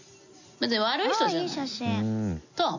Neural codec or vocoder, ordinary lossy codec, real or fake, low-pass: none; none; real; 7.2 kHz